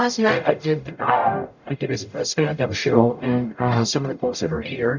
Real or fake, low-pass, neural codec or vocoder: fake; 7.2 kHz; codec, 44.1 kHz, 0.9 kbps, DAC